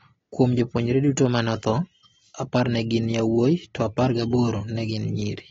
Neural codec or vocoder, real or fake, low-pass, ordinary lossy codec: none; real; 7.2 kHz; AAC, 24 kbps